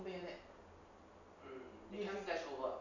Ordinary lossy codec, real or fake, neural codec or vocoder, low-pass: none; real; none; 7.2 kHz